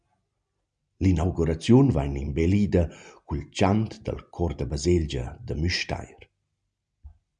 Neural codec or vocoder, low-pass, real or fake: none; 9.9 kHz; real